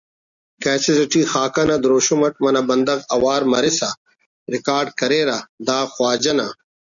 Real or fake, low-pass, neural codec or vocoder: real; 7.2 kHz; none